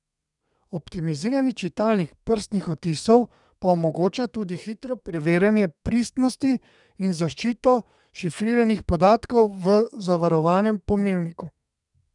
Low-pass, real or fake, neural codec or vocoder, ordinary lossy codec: 10.8 kHz; fake; codec, 32 kHz, 1.9 kbps, SNAC; none